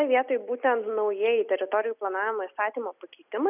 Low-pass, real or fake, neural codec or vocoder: 3.6 kHz; real; none